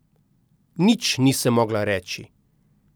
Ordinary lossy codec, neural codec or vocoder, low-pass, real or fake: none; none; none; real